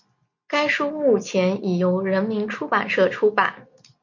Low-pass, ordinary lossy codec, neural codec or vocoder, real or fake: 7.2 kHz; MP3, 48 kbps; none; real